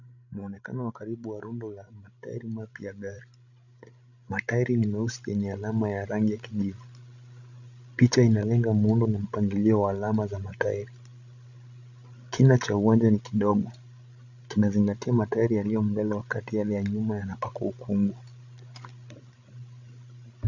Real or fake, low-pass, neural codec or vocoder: fake; 7.2 kHz; codec, 16 kHz, 16 kbps, FreqCodec, larger model